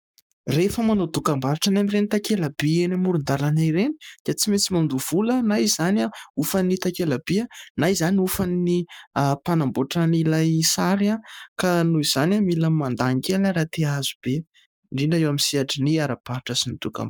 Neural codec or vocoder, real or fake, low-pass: codec, 44.1 kHz, 7.8 kbps, Pupu-Codec; fake; 19.8 kHz